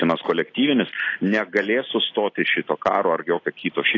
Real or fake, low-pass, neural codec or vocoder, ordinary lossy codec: real; 7.2 kHz; none; AAC, 32 kbps